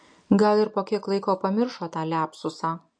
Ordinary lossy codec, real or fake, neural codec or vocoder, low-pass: MP3, 48 kbps; real; none; 9.9 kHz